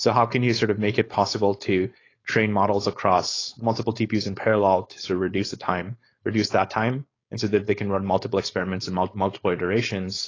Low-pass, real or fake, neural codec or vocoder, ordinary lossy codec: 7.2 kHz; fake; codec, 16 kHz, 4.8 kbps, FACodec; AAC, 32 kbps